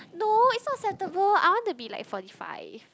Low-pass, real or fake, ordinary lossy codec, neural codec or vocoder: none; real; none; none